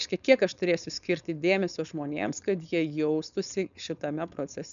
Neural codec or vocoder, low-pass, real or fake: codec, 16 kHz, 4.8 kbps, FACodec; 7.2 kHz; fake